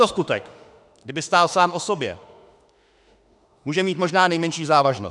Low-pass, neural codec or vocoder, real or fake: 10.8 kHz; autoencoder, 48 kHz, 32 numbers a frame, DAC-VAE, trained on Japanese speech; fake